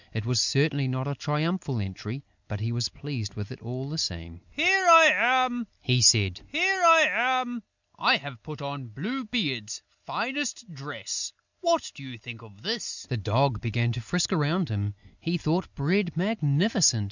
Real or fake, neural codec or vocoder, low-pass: real; none; 7.2 kHz